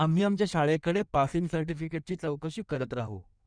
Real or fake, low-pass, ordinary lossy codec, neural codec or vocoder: fake; 9.9 kHz; none; codec, 16 kHz in and 24 kHz out, 1.1 kbps, FireRedTTS-2 codec